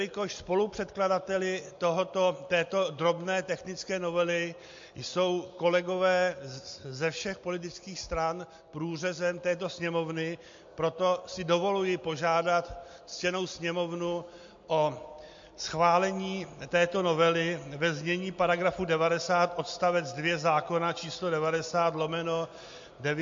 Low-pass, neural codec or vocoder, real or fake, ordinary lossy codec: 7.2 kHz; none; real; MP3, 48 kbps